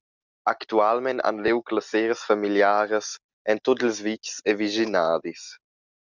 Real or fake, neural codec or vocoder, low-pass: real; none; 7.2 kHz